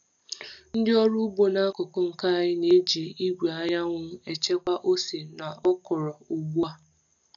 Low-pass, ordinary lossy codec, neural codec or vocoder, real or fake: 7.2 kHz; none; none; real